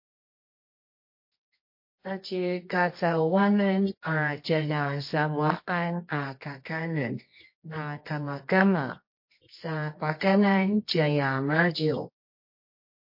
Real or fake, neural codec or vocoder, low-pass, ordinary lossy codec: fake; codec, 24 kHz, 0.9 kbps, WavTokenizer, medium music audio release; 5.4 kHz; MP3, 32 kbps